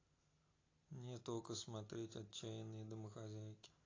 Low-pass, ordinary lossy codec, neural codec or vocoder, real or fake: 7.2 kHz; AAC, 32 kbps; none; real